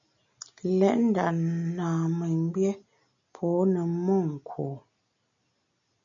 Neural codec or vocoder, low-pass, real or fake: none; 7.2 kHz; real